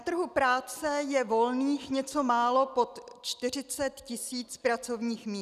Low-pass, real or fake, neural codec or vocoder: 14.4 kHz; real; none